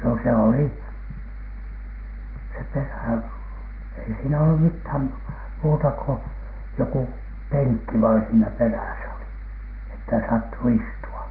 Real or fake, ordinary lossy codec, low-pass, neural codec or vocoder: real; Opus, 16 kbps; 5.4 kHz; none